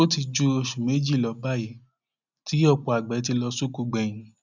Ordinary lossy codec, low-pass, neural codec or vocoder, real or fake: none; 7.2 kHz; none; real